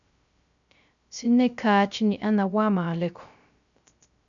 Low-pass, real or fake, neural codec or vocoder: 7.2 kHz; fake; codec, 16 kHz, 0.3 kbps, FocalCodec